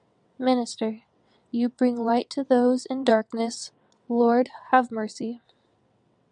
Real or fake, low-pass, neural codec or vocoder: fake; 9.9 kHz; vocoder, 22.05 kHz, 80 mel bands, WaveNeXt